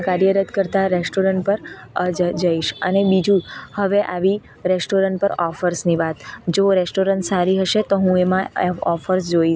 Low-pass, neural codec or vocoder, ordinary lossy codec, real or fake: none; none; none; real